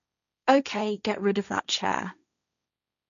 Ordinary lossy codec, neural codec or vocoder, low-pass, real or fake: none; codec, 16 kHz, 1.1 kbps, Voila-Tokenizer; 7.2 kHz; fake